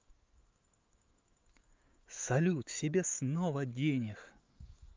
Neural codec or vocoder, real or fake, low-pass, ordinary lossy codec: autoencoder, 48 kHz, 128 numbers a frame, DAC-VAE, trained on Japanese speech; fake; 7.2 kHz; Opus, 24 kbps